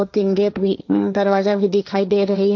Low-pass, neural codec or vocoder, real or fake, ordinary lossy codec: none; codec, 16 kHz, 1.1 kbps, Voila-Tokenizer; fake; none